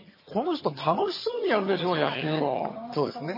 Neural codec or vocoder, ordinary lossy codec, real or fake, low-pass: vocoder, 22.05 kHz, 80 mel bands, HiFi-GAN; MP3, 24 kbps; fake; 5.4 kHz